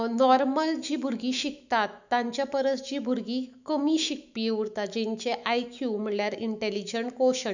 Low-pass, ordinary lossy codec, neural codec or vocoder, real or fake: 7.2 kHz; none; autoencoder, 48 kHz, 128 numbers a frame, DAC-VAE, trained on Japanese speech; fake